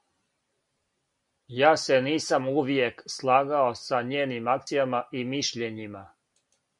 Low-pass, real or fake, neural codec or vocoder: 10.8 kHz; real; none